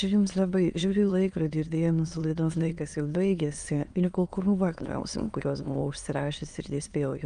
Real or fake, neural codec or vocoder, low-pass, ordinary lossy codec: fake; autoencoder, 22.05 kHz, a latent of 192 numbers a frame, VITS, trained on many speakers; 9.9 kHz; Opus, 32 kbps